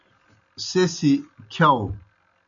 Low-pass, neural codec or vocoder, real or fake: 7.2 kHz; none; real